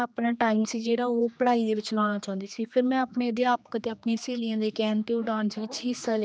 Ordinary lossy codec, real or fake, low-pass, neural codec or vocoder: none; fake; none; codec, 16 kHz, 2 kbps, X-Codec, HuBERT features, trained on general audio